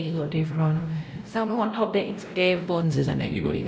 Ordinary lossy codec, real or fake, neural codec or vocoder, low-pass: none; fake; codec, 16 kHz, 0.5 kbps, X-Codec, WavLM features, trained on Multilingual LibriSpeech; none